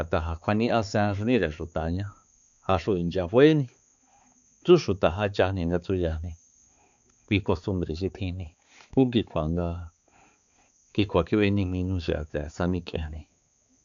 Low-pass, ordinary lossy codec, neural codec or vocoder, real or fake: 7.2 kHz; none; codec, 16 kHz, 4 kbps, X-Codec, HuBERT features, trained on balanced general audio; fake